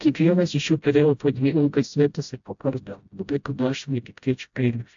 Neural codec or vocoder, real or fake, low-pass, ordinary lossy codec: codec, 16 kHz, 0.5 kbps, FreqCodec, smaller model; fake; 7.2 kHz; AAC, 64 kbps